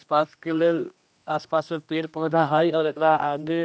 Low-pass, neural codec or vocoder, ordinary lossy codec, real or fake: none; codec, 16 kHz, 1 kbps, X-Codec, HuBERT features, trained on general audio; none; fake